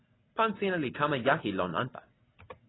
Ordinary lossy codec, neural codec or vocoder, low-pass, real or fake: AAC, 16 kbps; none; 7.2 kHz; real